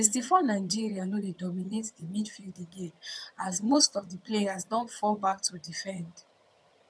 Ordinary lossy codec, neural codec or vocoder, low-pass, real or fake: none; vocoder, 22.05 kHz, 80 mel bands, HiFi-GAN; none; fake